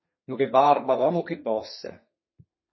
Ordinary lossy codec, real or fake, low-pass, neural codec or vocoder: MP3, 24 kbps; fake; 7.2 kHz; codec, 32 kHz, 1.9 kbps, SNAC